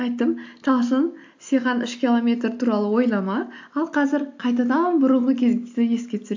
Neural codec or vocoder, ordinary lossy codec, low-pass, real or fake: none; AAC, 48 kbps; 7.2 kHz; real